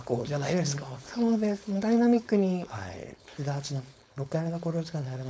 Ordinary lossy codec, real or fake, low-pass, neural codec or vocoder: none; fake; none; codec, 16 kHz, 4.8 kbps, FACodec